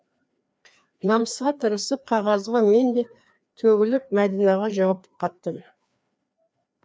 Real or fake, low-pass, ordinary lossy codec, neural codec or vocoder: fake; none; none; codec, 16 kHz, 2 kbps, FreqCodec, larger model